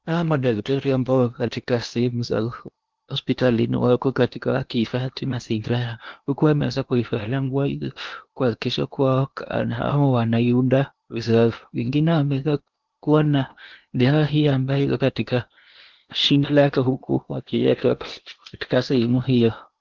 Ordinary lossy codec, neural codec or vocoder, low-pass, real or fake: Opus, 24 kbps; codec, 16 kHz in and 24 kHz out, 0.8 kbps, FocalCodec, streaming, 65536 codes; 7.2 kHz; fake